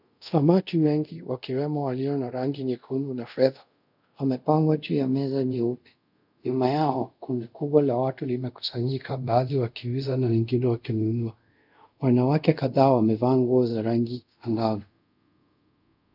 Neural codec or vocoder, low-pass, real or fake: codec, 24 kHz, 0.5 kbps, DualCodec; 5.4 kHz; fake